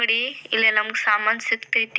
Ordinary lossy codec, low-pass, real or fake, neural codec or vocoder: none; none; real; none